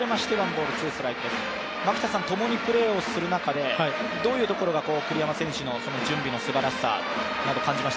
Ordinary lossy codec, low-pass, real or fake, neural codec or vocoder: none; none; real; none